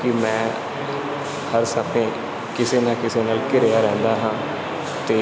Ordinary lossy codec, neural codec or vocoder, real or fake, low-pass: none; none; real; none